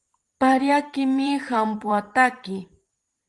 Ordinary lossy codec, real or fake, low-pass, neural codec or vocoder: Opus, 24 kbps; fake; 10.8 kHz; vocoder, 44.1 kHz, 128 mel bands every 512 samples, BigVGAN v2